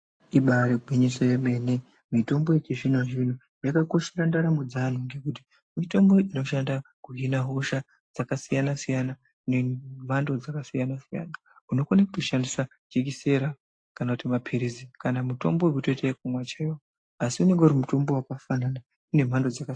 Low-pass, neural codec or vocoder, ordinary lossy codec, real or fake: 9.9 kHz; none; AAC, 48 kbps; real